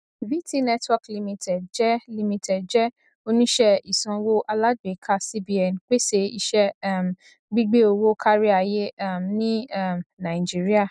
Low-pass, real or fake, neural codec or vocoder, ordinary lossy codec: 9.9 kHz; real; none; none